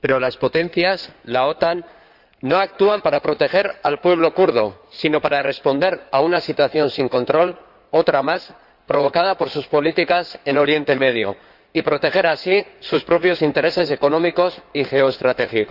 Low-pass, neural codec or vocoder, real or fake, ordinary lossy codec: 5.4 kHz; codec, 16 kHz in and 24 kHz out, 2.2 kbps, FireRedTTS-2 codec; fake; none